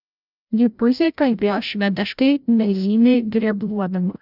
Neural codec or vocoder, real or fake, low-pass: codec, 16 kHz, 0.5 kbps, FreqCodec, larger model; fake; 5.4 kHz